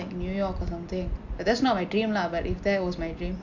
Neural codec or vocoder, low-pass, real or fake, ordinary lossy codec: none; 7.2 kHz; real; none